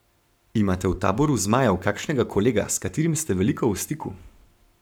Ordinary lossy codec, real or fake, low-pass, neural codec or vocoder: none; fake; none; codec, 44.1 kHz, 7.8 kbps, Pupu-Codec